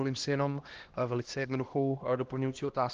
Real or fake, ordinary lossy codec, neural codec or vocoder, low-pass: fake; Opus, 16 kbps; codec, 16 kHz, 1 kbps, X-Codec, HuBERT features, trained on LibriSpeech; 7.2 kHz